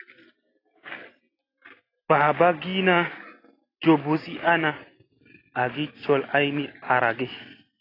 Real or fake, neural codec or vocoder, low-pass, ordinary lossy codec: real; none; 5.4 kHz; AAC, 24 kbps